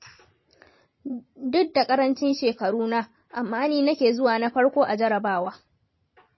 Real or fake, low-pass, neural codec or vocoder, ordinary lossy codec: fake; 7.2 kHz; vocoder, 44.1 kHz, 128 mel bands every 512 samples, BigVGAN v2; MP3, 24 kbps